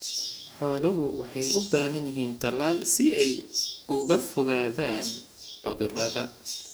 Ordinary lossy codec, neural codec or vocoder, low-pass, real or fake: none; codec, 44.1 kHz, 2.6 kbps, DAC; none; fake